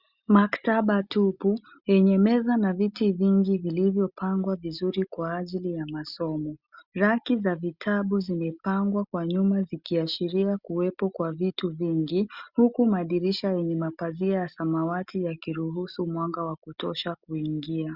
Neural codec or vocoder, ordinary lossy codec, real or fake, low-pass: none; Opus, 64 kbps; real; 5.4 kHz